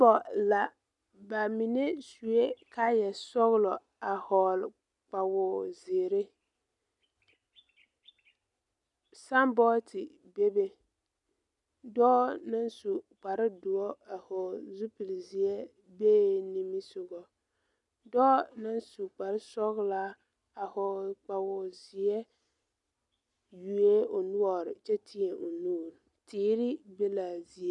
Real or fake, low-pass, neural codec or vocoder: real; 10.8 kHz; none